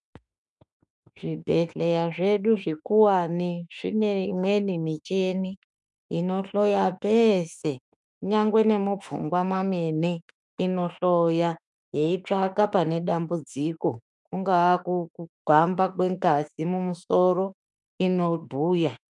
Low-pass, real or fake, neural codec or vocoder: 10.8 kHz; fake; autoencoder, 48 kHz, 32 numbers a frame, DAC-VAE, trained on Japanese speech